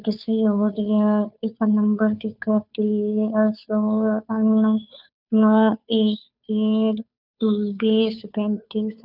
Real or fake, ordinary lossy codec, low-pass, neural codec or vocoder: fake; none; 5.4 kHz; codec, 16 kHz, 2 kbps, FunCodec, trained on Chinese and English, 25 frames a second